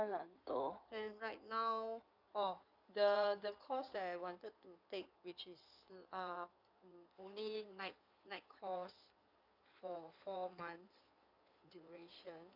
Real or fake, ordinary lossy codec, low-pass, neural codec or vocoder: fake; none; 5.4 kHz; codec, 16 kHz in and 24 kHz out, 2.2 kbps, FireRedTTS-2 codec